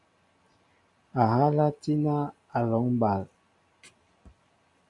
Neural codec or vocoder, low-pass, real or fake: none; 10.8 kHz; real